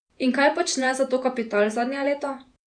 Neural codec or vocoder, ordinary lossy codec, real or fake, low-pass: vocoder, 48 kHz, 128 mel bands, Vocos; none; fake; 9.9 kHz